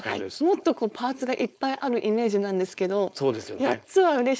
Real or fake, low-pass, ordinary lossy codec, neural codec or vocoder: fake; none; none; codec, 16 kHz, 4.8 kbps, FACodec